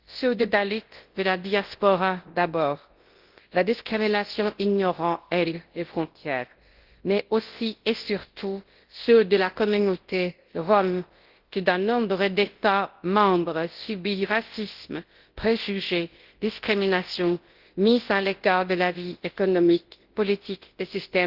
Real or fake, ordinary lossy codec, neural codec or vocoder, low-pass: fake; Opus, 16 kbps; codec, 24 kHz, 0.9 kbps, WavTokenizer, large speech release; 5.4 kHz